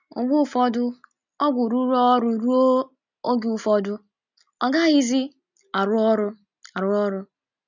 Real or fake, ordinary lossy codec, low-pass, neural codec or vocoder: real; none; 7.2 kHz; none